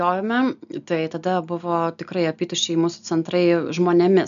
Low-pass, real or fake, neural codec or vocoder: 7.2 kHz; real; none